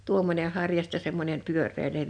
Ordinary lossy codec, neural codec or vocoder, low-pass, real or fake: none; none; 9.9 kHz; real